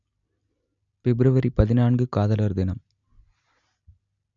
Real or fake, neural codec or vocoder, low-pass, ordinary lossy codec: real; none; 7.2 kHz; none